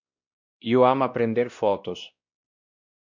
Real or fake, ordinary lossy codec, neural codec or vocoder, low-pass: fake; MP3, 64 kbps; codec, 16 kHz, 1 kbps, X-Codec, WavLM features, trained on Multilingual LibriSpeech; 7.2 kHz